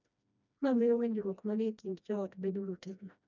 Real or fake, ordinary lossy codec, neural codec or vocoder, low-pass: fake; none; codec, 16 kHz, 1 kbps, FreqCodec, smaller model; 7.2 kHz